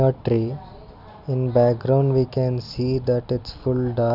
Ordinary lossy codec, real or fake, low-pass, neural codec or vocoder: none; real; 5.4 kHz; none